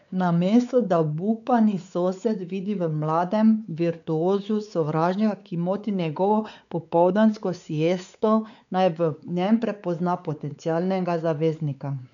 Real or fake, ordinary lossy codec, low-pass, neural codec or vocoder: fake; none; 7.2 kHz; codec, 16 kHz, 4 kbps, X-Codec, WavLM features, trained on Multilingual LibriSpeech